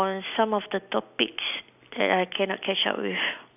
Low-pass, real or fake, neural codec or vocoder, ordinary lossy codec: 3.6 kHz; real; none; none